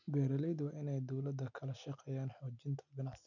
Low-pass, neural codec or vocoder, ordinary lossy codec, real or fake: 7.2 kHz; none; none; real